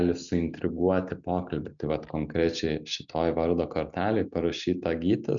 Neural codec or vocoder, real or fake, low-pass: none; real; 7.2 kHz